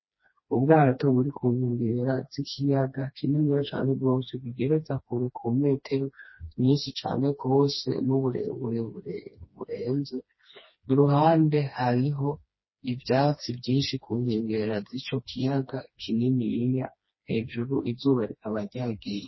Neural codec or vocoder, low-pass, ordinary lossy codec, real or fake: codec, 16 kHz, 2 kbps, FreqCodec, smaller model; 7.2 kHz; MP3, 24 kbps; fake